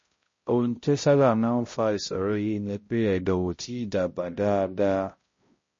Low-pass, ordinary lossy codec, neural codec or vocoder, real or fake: 7.2 kHz; MP3, 32 kbps; codec, 16 kHz, 0.5 kbps, X-Codec, HuBERT features, trained on balanced general audio; fake